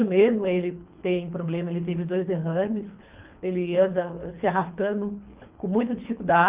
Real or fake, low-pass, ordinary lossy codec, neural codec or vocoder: fake; 3.6 kHz; Opus, 32 kbps; codec, 24 kHz, 3 kbps, HILCodec